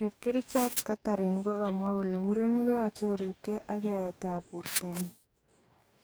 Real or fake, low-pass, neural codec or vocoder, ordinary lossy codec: fake; none; codec, 44.1 kHz, 2.6 kbps, DAC; none